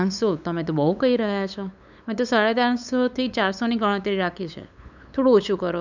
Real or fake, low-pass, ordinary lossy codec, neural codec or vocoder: fake; 7.2 kHz; none; codec, 16 kHz, 8 kbps, FunCodec, trained on LibriTTS, 25 frames a second